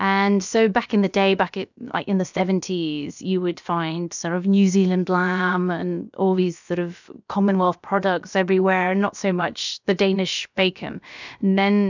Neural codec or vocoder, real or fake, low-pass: codec, 16 kHz, about 1 kbps, DyCAST, with the encoder's durations; fake; 7.2 kHz